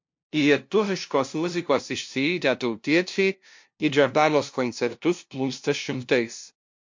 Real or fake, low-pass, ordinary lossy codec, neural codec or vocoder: fake; 7.2 kHz; MP3, 48 kbps; codec, 16 kHz, 0.5 kbps, FunCodec, trained on LibriTTS, 25 frames a second